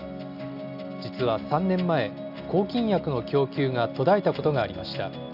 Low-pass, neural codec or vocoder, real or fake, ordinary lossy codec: 5.4 kHz; none; real; none